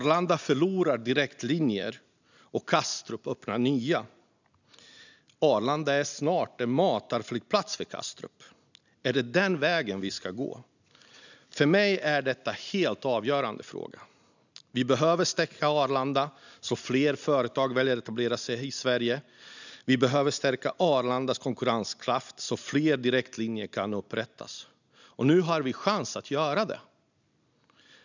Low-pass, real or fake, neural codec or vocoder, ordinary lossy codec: 7.2 kHz; real; none; none